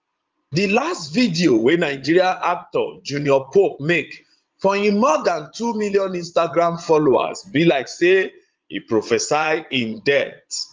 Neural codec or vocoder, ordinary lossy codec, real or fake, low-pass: vocoder, 22.05 kHz, 80 mel bands, Vocos; Opus, 32 kbps; fake; 7.2 kHz